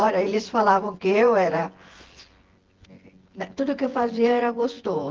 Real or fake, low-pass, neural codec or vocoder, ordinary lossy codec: fake; 7.2 kHz; vocoder, 24 kHz, 100 mel bands, Vocos; Opus, 16 kbps